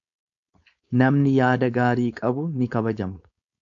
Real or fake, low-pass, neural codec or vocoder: fake; 7.2 kHz; codec, 16 kHz, 4.8 kbps, FACodec